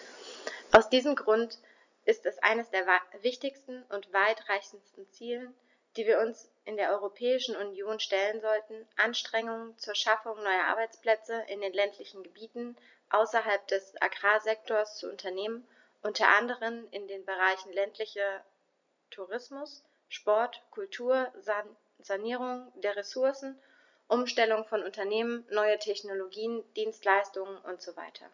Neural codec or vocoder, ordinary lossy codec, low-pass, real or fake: none; none; 7.2 kHz; real